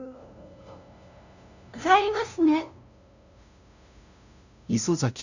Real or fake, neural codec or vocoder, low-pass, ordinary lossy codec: fake; codec, 16 kHz, 0.5 kbps, FunCodec, trained on LibriTTS, 25 frames a second; 7.2 kHz; none